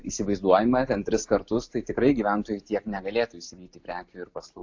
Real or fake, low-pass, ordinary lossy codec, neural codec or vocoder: real; 7.2 kHz; AAC, 48 kbps; none